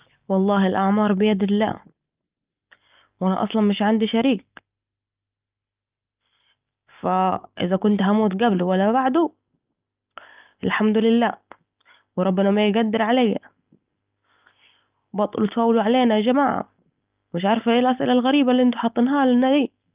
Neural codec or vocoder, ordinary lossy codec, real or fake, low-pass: none; Opus, 24 kbps; real; 3.6 kHz